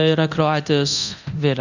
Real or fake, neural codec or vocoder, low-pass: fake; codec, 24 kHz, 0.9 kbps, DualCodec; 7.2 kHz